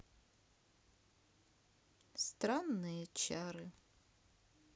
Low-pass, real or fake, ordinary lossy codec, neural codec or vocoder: none; real; none; none